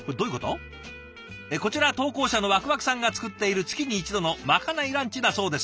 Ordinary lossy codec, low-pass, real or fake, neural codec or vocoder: none; none; real; none